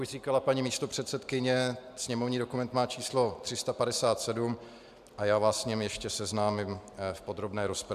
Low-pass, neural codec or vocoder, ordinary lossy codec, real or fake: 14.4 kHz; none; AAC, 96 kbps; real